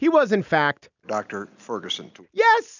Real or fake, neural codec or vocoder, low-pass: real; none; 7.2 kHz